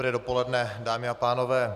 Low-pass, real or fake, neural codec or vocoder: 14.4 kHz; real; none